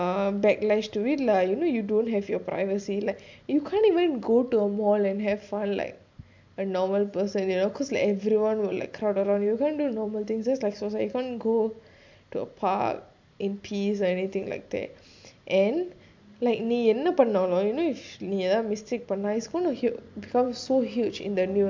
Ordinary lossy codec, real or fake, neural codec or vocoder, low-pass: MP3, 64 kbps; real; none; 7.2 kHz